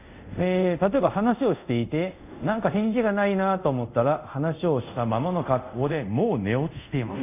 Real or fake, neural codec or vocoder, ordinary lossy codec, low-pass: fake; codec, 24 kHz, 0.5 kbps, DualCodec; none; 3.6 kHz